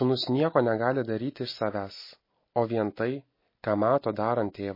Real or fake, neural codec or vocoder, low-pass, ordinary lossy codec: real; none; 5.4 kHz; MP3, 24 kbps